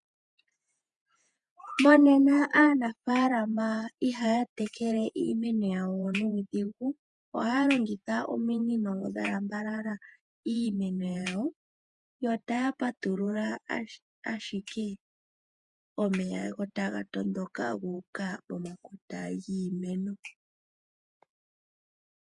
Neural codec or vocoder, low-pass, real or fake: vocoder, 48 kHz, 128 mel bands, Vocos; 10.8 kHz; fake